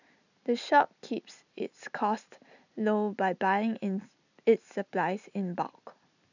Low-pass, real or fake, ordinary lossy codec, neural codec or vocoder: 7.2 kHz; real; none; none